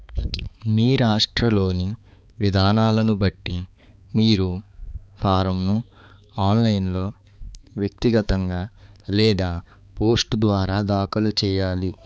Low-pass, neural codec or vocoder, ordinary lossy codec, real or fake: none; codec, 16 kHz, 4 kbps, X-Codec, HuBERT features, trained on balanced general audio; none; fake